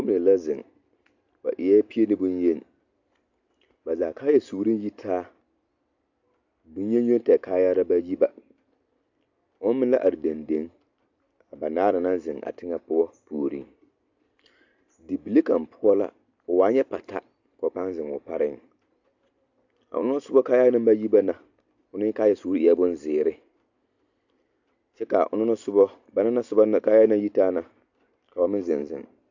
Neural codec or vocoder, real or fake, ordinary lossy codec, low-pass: none; real; AAC, 48 kbps; 7.2 kHz